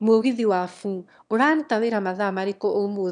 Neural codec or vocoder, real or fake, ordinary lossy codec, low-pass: autoencoder, 22.05 kHz, a latent of 192 numbers a frame, VITS, trained on one speaker; fake; none; 9.9 kHz